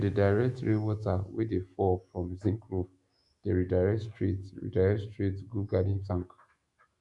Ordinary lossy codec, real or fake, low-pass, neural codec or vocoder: Opus, 64 kbps; fake; 10.8 kHz; autoencoder, 48 kHz, 128 numbers a frame, DAC-VAE, trained on Japanese speech